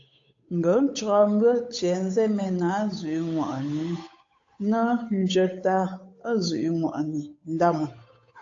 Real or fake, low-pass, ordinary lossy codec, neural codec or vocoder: fake; 7.2 kHz; AAC, 48 kbps; codec, 16 kHz, 8 kbps, FunCodec, trained on Chinese and English, 25 frames a second